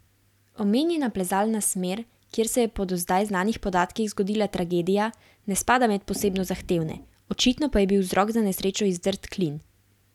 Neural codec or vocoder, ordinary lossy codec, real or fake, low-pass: none; none; real; 19.8 kHz